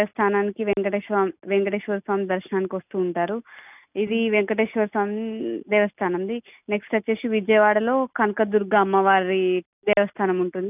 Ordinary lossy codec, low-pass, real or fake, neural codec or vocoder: none; 3.6 kHz; real; none